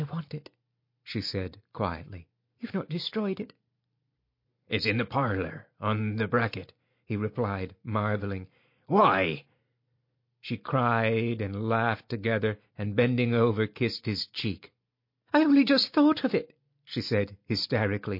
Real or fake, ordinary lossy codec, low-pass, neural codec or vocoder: real; MP3, 32 kbps; 5.4 kHz; none